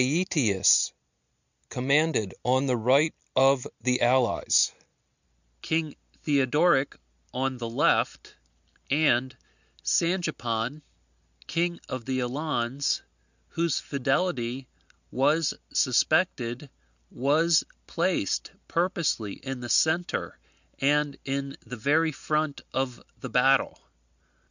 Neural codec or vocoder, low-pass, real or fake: none; 7.2 kHz; real